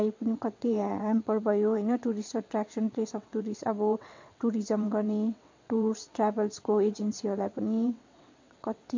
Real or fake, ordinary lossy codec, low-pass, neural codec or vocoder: fake; MP3, 48 kbps; 7.2 kHz; vocoder, 22.05 kHz, 80 mel bands, WaveNeXt